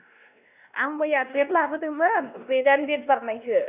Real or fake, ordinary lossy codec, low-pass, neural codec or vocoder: fake; none; 3.6 kHz; codec, 16 kHz, 1 kbps, X-Codec, WavLM features, trained on Multilingual LibriSpeech